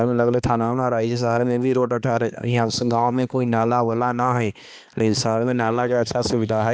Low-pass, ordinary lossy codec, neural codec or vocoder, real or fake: none; none; codec, 16 kHz, 2 kbps, X-Codec, HuBERT features, trained on balanced general audio; fake